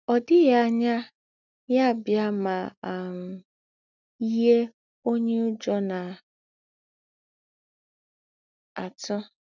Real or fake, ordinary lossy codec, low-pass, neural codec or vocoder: real; none; 7.2 kHz; none